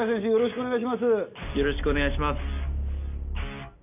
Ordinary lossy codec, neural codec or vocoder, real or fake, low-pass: none; none; real; 3.6 kHz